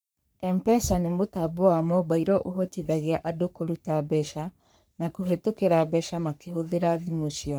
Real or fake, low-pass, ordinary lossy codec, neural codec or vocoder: fake; none; none; codec, 44.1 kHz, 3.4 kbps, Pupu-Codec